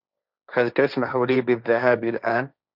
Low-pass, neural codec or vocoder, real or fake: 5.4 kHz; codec, 16 kHz, 1.1 kbps, Voila-Tokenizer; fake